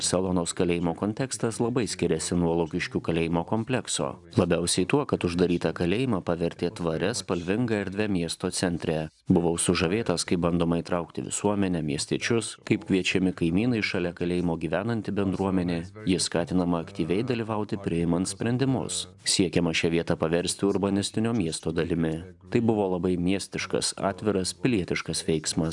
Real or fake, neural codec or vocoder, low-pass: real; none; 10.8 kHz